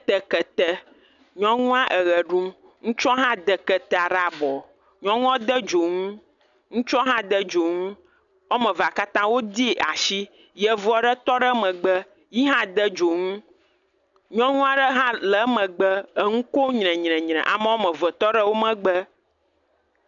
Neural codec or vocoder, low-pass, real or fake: none; 7.2 kHz; real